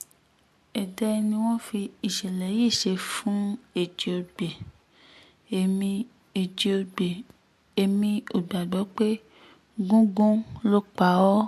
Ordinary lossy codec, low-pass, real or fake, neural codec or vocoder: MP3, 64 kbps; 14.4 kHz; real; none